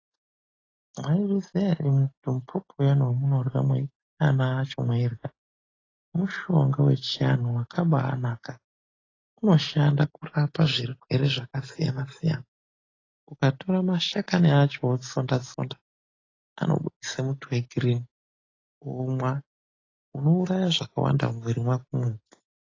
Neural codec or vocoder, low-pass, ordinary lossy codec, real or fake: none; 7.2 kHz; AAC, 32 kbps; real